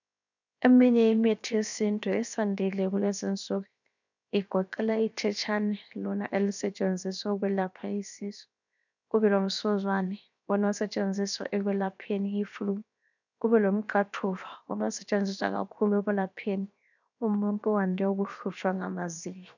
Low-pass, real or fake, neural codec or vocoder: 7.2 kHz; fake; codec, 16 kHz, 0.7 kbps, FocalCodec